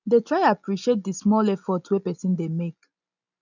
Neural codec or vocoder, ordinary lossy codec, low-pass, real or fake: none; none; 7.2 kHz; real